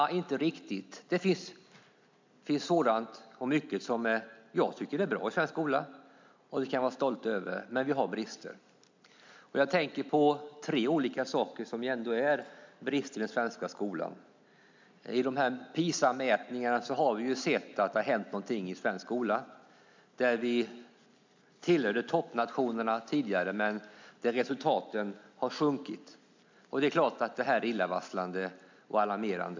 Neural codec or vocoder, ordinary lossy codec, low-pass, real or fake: none; MP3, 64 kbps; 7.2 kHz; real